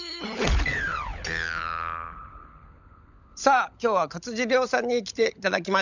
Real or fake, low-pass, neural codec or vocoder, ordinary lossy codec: fake; 7.2 kHz; codec, 16 kHz, 16 kbps, FunCodec, trained on LibriTTS, 50 frames a second; none